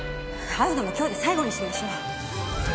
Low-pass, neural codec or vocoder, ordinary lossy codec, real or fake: none; none; none; real